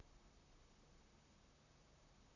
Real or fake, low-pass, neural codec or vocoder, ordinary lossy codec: fake; 7.2 kHz; vocoder, 44.1 kHz, 128 mel bands, Pupu-Vocoder; none